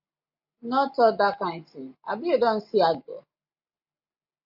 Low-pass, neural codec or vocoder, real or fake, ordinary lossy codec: 5.4 kHz; none; real; AAC, 48 kbps